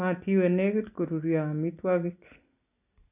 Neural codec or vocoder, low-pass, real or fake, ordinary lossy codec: none; 3.6 kHz; real; none